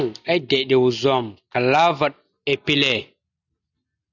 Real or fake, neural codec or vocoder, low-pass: real; none; 7.2 kHz